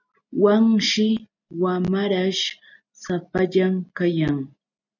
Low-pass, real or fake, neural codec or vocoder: 7.2 kHz; real; none